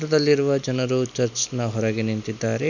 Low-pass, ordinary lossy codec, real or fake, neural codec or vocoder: 7.2 kHz; none; real; none